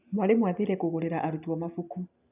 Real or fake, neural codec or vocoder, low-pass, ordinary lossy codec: real; none; 3.6 kHz; none